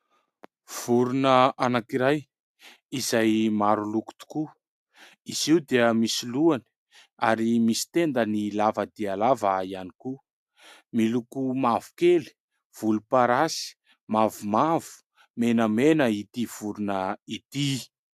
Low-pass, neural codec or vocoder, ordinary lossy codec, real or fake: 14.4 kHz; none; AAC, 96 kbps; real